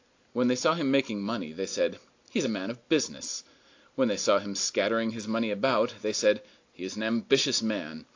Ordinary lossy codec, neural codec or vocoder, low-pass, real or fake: AAC, 48 kbps; none; 7.2 kHz; real